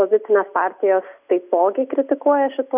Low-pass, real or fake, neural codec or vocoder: 3.6 kHz; real; none